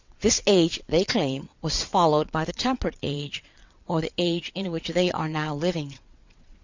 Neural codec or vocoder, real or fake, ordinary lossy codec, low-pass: vocoder, 22.05 kHz, 80 mel bands, WaveNeXt; fake; Opus, 64 kbps; 7.2 kHz